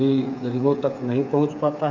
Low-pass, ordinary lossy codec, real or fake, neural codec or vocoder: 7.2 kHz; none; fake; codec, 16 kHz, 8 kbps, FreqCodec, smaller model